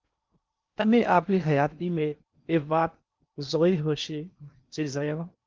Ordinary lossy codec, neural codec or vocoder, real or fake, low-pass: Opus, 32 kbps; codec, 16 kHz in and 24 kHz out, 0.8 kbps, FocalCodec, streaming, 65536 codes; fake; 7.2 kHz